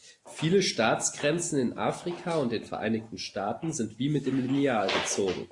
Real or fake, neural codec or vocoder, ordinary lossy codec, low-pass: real; none; AAC, 48 kbps; 10.8 kHz